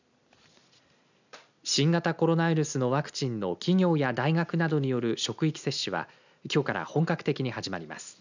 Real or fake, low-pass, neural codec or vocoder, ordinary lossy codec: real; 7.2 kHz; none; none